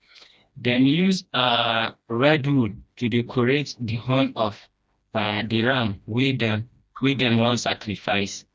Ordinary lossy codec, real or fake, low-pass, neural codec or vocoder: none; fake; none; codec, 16 kHz, 1 kbps, FreqCodec, smaller model